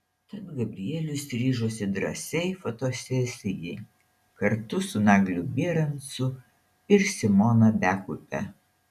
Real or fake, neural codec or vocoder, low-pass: real; none; 14.4 kHz